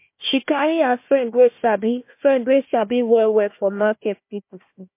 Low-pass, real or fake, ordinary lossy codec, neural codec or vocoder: 3.6 kHz; fake; MP3, 24 kbps; codec, 16 kHz, 1 kbps, FunCodec, trained on Chinese and English, 50 frames a second